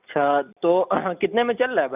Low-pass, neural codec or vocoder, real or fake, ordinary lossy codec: 3.6 kHz; none; real; none